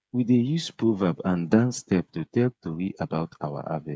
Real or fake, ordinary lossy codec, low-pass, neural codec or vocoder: fake; none; none; codec, 16 kHz, 8 kbps, FreqCodec, smaller model